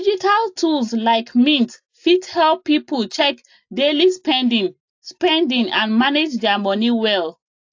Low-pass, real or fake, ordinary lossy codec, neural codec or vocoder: 7.2 kHz; real; AAC, 48 kbps; none